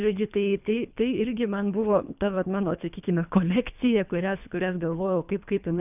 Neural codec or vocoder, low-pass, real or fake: codec, 24 kHz, 3 kbps, HILCodec; 3.6 kHz; fake